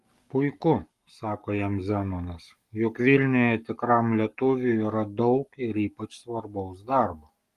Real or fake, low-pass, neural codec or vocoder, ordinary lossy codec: fake; 14.4 kHz; codec, 44.1 kHz, 7.8 kbps, Pupu-Codec; Opus, 32 kbps